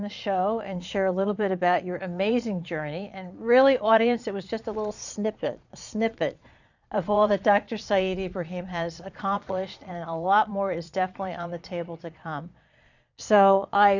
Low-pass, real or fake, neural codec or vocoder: 7.2 kHz; fake; vocoder, 22.05 kHz, 80 mel bands, Vocos